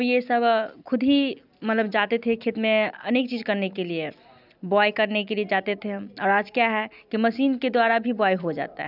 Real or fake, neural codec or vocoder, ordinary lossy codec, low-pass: real; none; none; 5.4 kHz